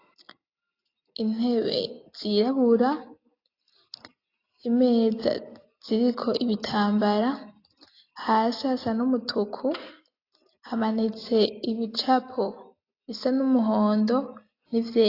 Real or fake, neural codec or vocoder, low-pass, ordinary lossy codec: real; none; 5.4 kHz; AAC, 32 kbps